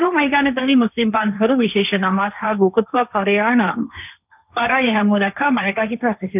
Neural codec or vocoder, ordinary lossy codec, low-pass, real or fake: codec, 16 kHz, 1.1 kbps, Voila-Tokenizer; none; 3.6 kHz; fake